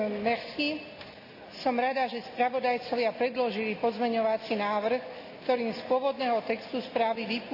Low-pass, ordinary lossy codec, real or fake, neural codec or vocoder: 5.4 kHz; none; real; none